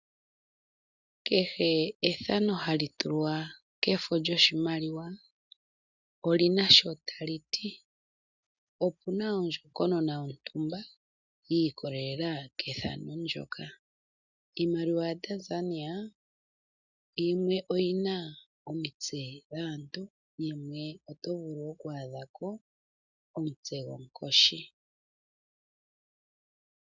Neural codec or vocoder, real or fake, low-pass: none; real; 7.2 kHz